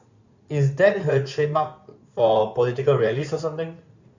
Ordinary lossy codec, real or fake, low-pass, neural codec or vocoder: none; fake; 7.2 kHz; codec, 16 kHz in and 24 kHz out, 2.2 kbps, FireRedTTS-2 codec